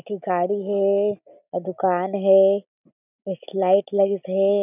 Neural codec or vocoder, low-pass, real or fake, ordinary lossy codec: none; 3.6 kHz; real; none